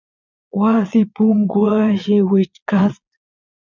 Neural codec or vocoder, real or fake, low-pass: vocoder, 22.05 kHz, 80 mel bands, Vocos; fake; 7.2 kHz